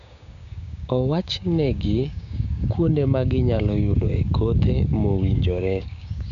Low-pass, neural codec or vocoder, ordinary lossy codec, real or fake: 7.2 kHz; codec, 16 kHz, 6 kbps, DAC; none; fake